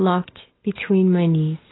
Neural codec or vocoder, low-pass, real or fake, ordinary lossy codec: none; 7.2 kHz; real; AAC, 16 kbps